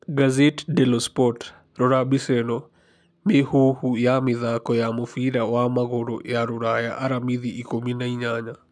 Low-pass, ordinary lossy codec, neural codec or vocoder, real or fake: none; none; none; real